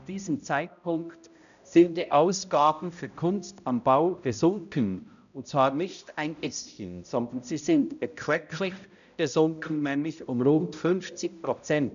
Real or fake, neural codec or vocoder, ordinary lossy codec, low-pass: fake; codec, 16 kHz, 0.5 kbps, X-Codec, HuBERT features, trained on balanced general audio; none; 7.2 kHz